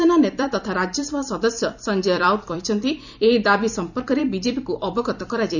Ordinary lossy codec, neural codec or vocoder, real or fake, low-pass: none; vocoder, 44.1 kHz, 128 mel bands every 256 samples, BigVGAN v2; fake; 7.2 kHz